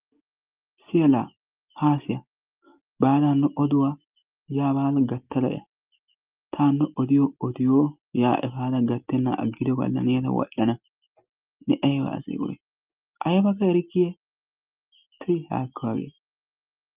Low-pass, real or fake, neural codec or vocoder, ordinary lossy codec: 3.6 kHz; real; none; Opus, 24 kbps